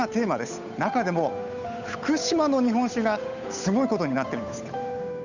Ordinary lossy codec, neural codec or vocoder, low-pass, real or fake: none; codec, 16 kHz, 8 kbps, FunCodec, trained on Chinese and English, 25 frames a second; 7.2 kHz; fake